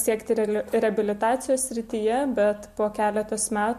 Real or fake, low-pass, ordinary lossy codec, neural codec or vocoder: real; 14.4 kHz; MP3, 64 kbps; none